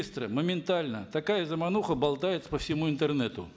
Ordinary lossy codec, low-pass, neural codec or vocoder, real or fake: none; none; none; real